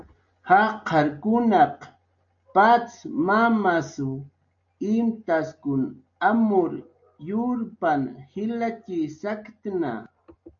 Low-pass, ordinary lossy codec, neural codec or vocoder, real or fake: 7.2 kHz; MP3, 48 kbps; none; real